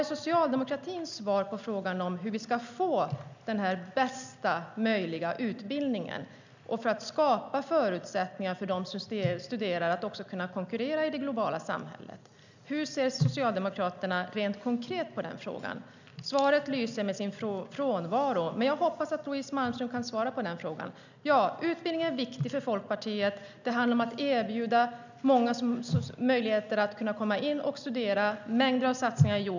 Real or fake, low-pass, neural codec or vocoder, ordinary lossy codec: real; 7.2 kHz; none; none